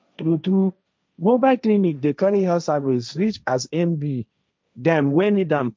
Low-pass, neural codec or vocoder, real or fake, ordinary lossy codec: none; codec, 16 kHz, 1.1 kbps, Voila-Tokenizer; fake; none